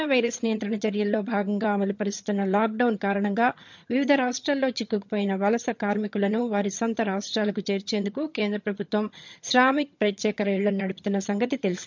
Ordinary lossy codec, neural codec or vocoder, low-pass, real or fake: MP3, 64 kbps; vocoder, 22.05 kHz, 80 mel bands, HiFi-GAN; 7.2 kHz; fake